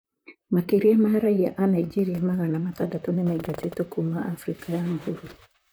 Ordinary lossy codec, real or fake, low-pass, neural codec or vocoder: none; fake; none; vocoder, 44.1 kHz, 128 mel bands, Pupu-Vocoder